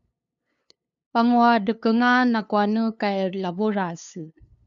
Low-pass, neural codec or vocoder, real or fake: 7.2 kHz; codec, 16 kHz, 2 kbps, FunCodec, trained on LibriTTS, 25 frames a second; fake